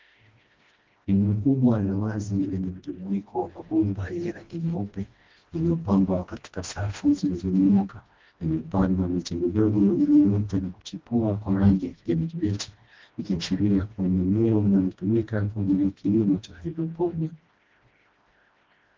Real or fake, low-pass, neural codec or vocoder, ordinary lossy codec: fake; 7.2 kHz; codec, 16 kHz, 1 kbps, FreqCodec, smaller model; Opus, 32 kbps